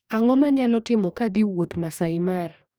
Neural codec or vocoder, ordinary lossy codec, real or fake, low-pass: codec, 44.1 kHz, 2.6 kbps, DAC; none; fake; none